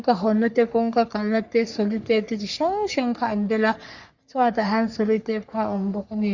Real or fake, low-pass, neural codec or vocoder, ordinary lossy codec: fake; 7.2 kHz; codec, 44.1 kHz, 3.4 kbps, Pupu-Codec; Opus, 64 kbps